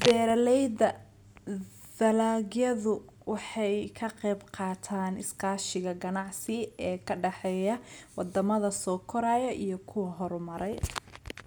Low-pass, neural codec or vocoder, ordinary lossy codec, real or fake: none; none; none; real